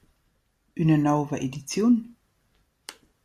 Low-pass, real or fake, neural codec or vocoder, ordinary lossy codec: 14.4 kHz; real; none; Opus, 64 kbps